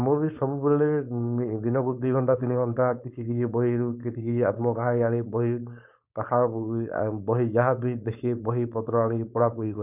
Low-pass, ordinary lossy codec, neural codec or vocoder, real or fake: 3.6 kHz; none; codec, 16 kHz, 4.8 kbps, FACodec; fake